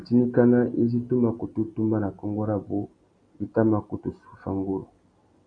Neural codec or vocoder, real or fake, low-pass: none; real; 9.9 kHz